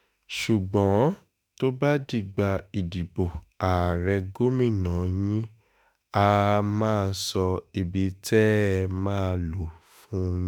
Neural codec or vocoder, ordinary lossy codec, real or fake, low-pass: autoencoder, 48 kHz, 32 numbers a frame, DAC-VAE, trained on Japanese speech; none; fake; none